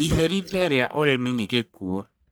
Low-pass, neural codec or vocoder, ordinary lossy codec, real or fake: none; codec, 44.1 kHz, 1.7 kbps, Pupu-Codec; none; fake